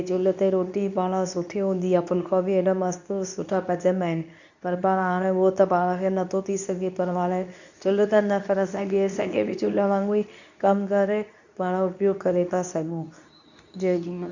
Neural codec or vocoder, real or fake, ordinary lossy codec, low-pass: codec, 24 kHz, 0.9 kbps, WavTokenizer, medium speech release version 2; fake; none; 7.2 kHz